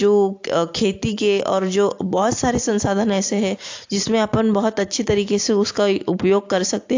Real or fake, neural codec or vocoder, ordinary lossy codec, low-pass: real; none; AAC, 48 kbps; 7.2 kHz